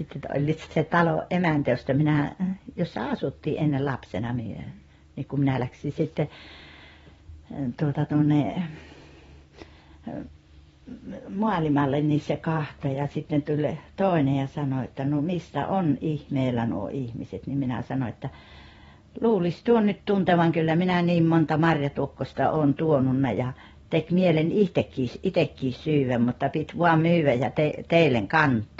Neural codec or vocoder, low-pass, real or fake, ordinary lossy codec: vocoder, 44.1 kHz, 128 mel bands every 512 samples, BigVGAN v2; 19.8 kHz; fake; AAC, 24 kbps